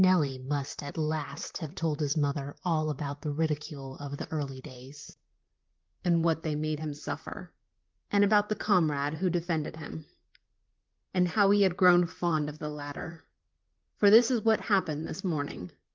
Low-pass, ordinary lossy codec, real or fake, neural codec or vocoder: 7.2 kHz; Opus, 32 kbps; fake; vocoder, 44.1 kHz, 128 mel bands, Pupu-Vocoder